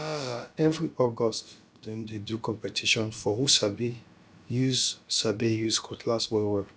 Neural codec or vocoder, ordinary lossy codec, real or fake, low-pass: codec, 16 kHz, about 1 kbps, DyCAST, with the encoder's durations; none; fake; none